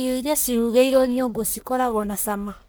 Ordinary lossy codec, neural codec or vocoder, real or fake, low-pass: none; codec, 44.1 kHz, 1.7 kbps, Pupu-Codec; fake; none